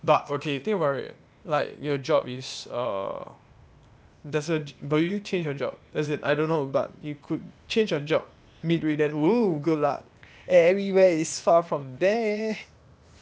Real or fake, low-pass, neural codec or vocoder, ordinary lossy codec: fake; none; codec, 16 kHz, 0.8 kbps, ZipCodec; none